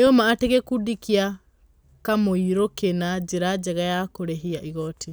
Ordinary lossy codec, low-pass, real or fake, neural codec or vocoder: none; none; real; none